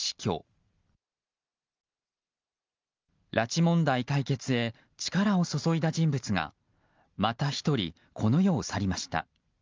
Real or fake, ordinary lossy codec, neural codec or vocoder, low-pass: real; Opus, 32 kbps; none; 7.2 kHz